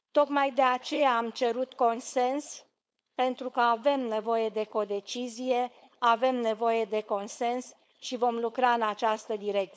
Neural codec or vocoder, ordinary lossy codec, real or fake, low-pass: codec, 16 kHz, 4.8 kbps, FACodec; none; fake; none